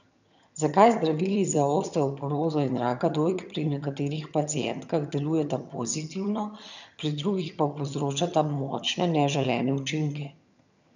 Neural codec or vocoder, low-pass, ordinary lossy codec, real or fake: vocoder, 22.05 kHz, 80 mel bands, HiFi-GAN; 7.2 kHz; none; fake